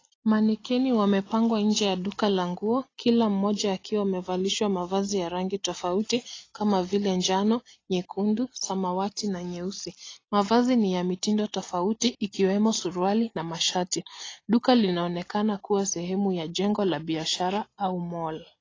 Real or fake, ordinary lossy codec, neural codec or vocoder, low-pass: real; AAC, 32 kbps; none; 7.2 kHz